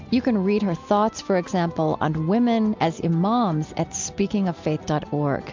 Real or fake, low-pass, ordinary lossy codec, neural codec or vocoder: real; 7.2 kHz; MP3, 64 kbps; none